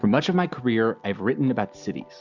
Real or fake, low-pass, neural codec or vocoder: real; 7.2 kHz; none